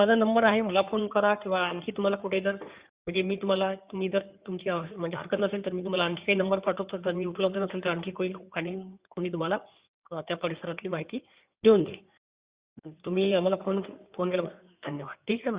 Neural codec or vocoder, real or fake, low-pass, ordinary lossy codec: codec, 16 kHz in and 24 kHz out, 2.2 kbps, FireRedTTS-2 codec; fake; 3.6 kHz; Opus, 64 kbps